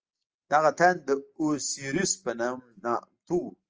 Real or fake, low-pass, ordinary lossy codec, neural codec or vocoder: real; 7.2 kHz; Opus, 24 kbps; none